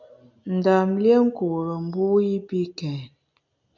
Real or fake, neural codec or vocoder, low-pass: real; none; 7.2 kHz